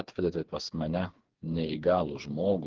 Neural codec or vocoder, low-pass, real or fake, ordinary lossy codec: codec, 16 kHz, 4 kbps, FreqCodec, smaller model; 7.2 kHz; fake; Opus, 16 kbps